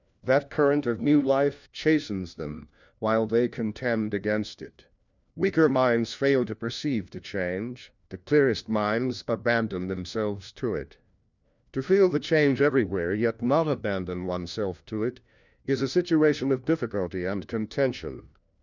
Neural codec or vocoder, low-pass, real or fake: codec, 16 kHz, 1 kbps, FunCodec, trained on LibriTTS, 50 frames a second; 7.2 kHz; fake